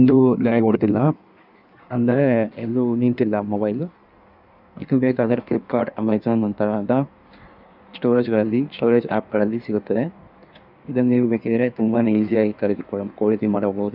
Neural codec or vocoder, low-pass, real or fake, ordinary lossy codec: codec, 16 kHz in and 24 kHz out, 1.1 kbps, FireRedTTS-2 codec; 5.4 kHz; fake; none